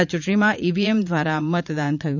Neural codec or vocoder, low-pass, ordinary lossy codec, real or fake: vocoder, 44.1 kHz, 80 mel bands, Vocos; 7.2 kHz; none; fake